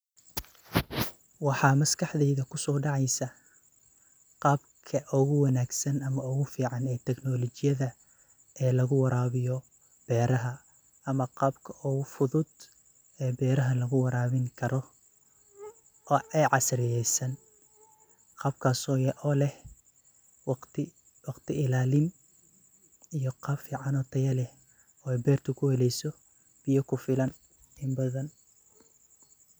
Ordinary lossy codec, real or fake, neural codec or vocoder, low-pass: none; real; none; none